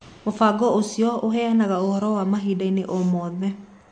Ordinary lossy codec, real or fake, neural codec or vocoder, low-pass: MP3, 48 kbps; real; none; 9.9 kHz